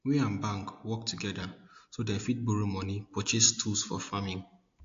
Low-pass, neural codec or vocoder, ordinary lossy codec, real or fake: 7.2 kHz; none; AAC, 48 kbps; real